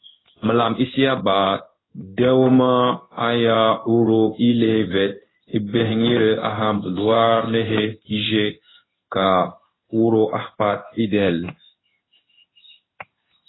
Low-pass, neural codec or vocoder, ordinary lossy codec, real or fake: 7.2 kHz; codec, 16 kHz in and 24 kHz out, 1 kbps, XY-Tokenizer; AAC, 16 kbps; fake